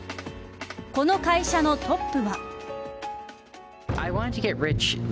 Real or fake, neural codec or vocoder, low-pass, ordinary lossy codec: real; none; none; none